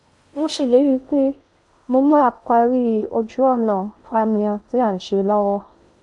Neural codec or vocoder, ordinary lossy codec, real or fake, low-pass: codec, 16 kHz in and 24 kHz out, 0.6 kbps, FocalCodec, streaming, 4096 codes; none; fake; 10.8 kHz